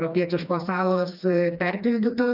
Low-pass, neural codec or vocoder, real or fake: 5.4 kHz; codec, 16 kHz, 2 kbps, FreqCodec, smaller model; fake